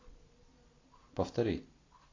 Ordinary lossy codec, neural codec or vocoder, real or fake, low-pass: AAC, 32 kbps; none; real; 7.2 kHz